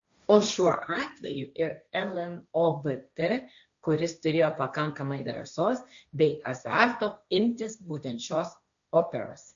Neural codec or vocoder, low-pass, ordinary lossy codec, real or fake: codec, 16 kHz, 1.1 kbps, Voila-Tokenizer; 7.2 kHz; MP3, 64 kbps; fake